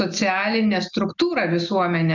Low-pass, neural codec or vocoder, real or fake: 7.2 kHz; none; real